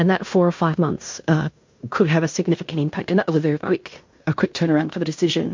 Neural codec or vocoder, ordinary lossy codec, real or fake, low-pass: codec, 16 kHz in and 24 kHz out, 0.9 kbps, LongCat-Audio-Codec, fine tuned four codebook decoder; MP3, 48 kbps; fake; 7.2 kHz